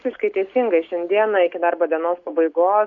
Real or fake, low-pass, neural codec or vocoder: real; 7.2 kHz; none